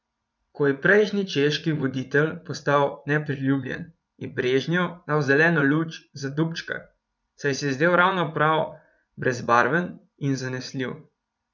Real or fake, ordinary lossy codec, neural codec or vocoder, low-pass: fake; none; vocoder, 44.1 kHz, 80 mel bands, Vocos; 7.2 kHz